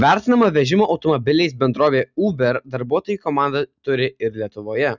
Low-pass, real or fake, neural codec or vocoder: 7.2 kHz; real; none